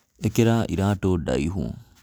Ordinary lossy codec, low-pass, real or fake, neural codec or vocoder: none; none; real; none